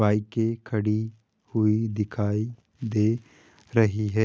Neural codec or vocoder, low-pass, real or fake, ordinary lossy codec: none; none; real; none